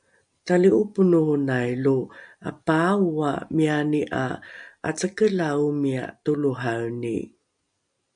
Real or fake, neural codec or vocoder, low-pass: real; none; 9.9 kHz